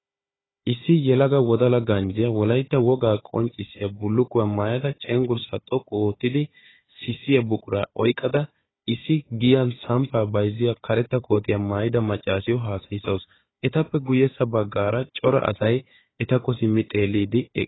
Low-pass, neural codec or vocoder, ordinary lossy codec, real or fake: 7.2 kHz; codec, 16 kHz, 4 kbps, FunCodec, trained on Chinese and English, 50 frames a second; AAC, 16 kbps; fake